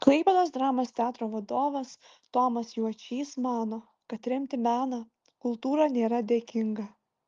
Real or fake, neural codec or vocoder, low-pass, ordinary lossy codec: real; none; 7.2 kHz; Opus, 32 kbps